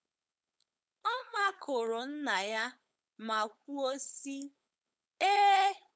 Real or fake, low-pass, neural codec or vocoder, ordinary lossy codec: fake; none; codec, 16 kHz, 4.8 kbps, FACodec; none